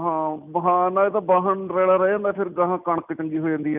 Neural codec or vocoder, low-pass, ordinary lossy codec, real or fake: none; 3.6 kHz; none; real